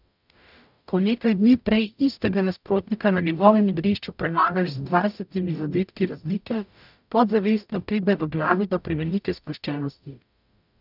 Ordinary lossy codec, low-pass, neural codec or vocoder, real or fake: none; 5.4 kHz; codec, 44.1 kHz, 0.9 kbps, DAC; fake